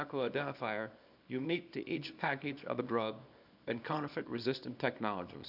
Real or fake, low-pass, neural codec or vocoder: fake; 5.4 kHz; codec, 24 kHz, 0.9 kbps, WavTokenizer, small release